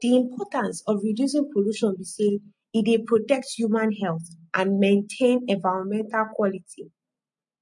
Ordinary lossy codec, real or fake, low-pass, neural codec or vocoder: MP3, 48 kbps; real; 10.8 kHz; none